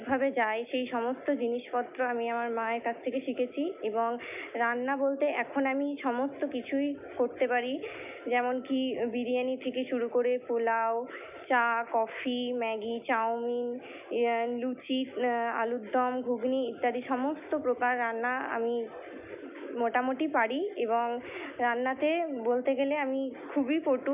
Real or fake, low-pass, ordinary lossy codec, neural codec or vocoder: real; 3.6 kHz; none; none